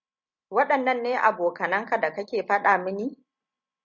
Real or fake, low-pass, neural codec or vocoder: real; 7.2 kHz; none